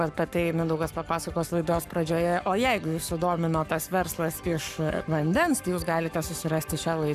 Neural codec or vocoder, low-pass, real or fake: codec, 44.1 kHz, 7.8 kbps, Pupu-Codec; 14.4 kHz; fake